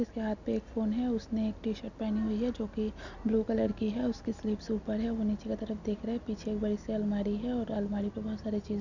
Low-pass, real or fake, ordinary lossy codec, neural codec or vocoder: 7.2 kHz; real; none; none